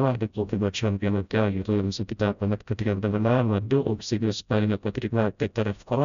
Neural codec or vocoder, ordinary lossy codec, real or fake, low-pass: codec, 16 kHz, 0.5 kbps, FreqCodec, smaller model; MP3, 96 kbps; fake; 7.2 kHz